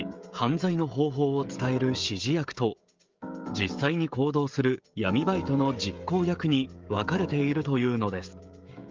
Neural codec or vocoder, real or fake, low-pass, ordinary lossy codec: codec, 16 kHz, 16 kbps, FreqCodec, smaller model; fake; 7.2 kHz; Opus, 32 kbps